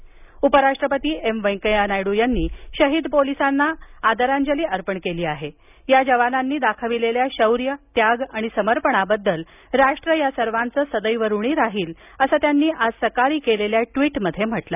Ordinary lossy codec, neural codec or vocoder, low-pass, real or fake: none; none; 3.6 kHz; real